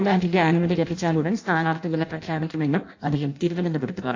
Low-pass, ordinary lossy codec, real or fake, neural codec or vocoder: 7.2 kHz; AAC, 48 kbps; fake; codec, 16 kHz in and 24 kHz out, 0.6 kbps, FireRedTTS-2 codec